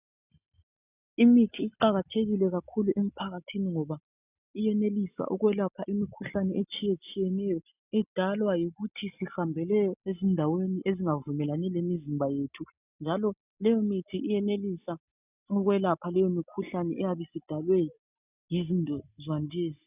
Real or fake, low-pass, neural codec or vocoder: real; 3.6 kHz; none